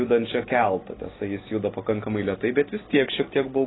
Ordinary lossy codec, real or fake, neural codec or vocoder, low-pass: AAC, 16 kbps; real; none; 7.2 kHz